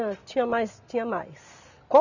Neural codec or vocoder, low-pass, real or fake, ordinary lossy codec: none; 7.2 kHz; real; none